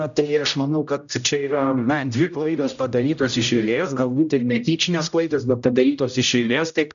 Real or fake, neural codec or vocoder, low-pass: fake; codec, 16 kHz, 0.5 kbps, X-Codec, HuBERT features, trained on general audio; 7.2 kHz